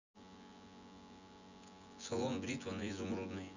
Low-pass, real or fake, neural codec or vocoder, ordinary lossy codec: 7.2 kHz; fake; vocoder, 24 kHz, 100 mel bands, Vocos; none